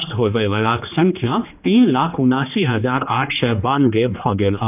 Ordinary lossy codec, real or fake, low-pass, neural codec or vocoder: none; fake; 3.6 kHz; codec, 16 kHz, 2 kbps, X-Codec, HuBERT features, trained on general audio